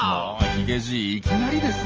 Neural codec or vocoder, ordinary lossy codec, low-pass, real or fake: none; Opus, 24 kbps; 7.2 kHz; real